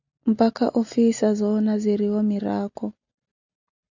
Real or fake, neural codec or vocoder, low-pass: real; none; 7.2 kHz